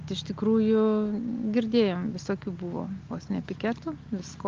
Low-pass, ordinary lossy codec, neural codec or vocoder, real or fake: 7.2 kHz; Opus, 32 kbps; none; real